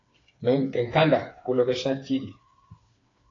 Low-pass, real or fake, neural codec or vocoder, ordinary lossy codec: 7.2 kHz; fake; codec, 16 kHz, 4 kbps, FreqCodec, smaller model; AAC, 32 kbps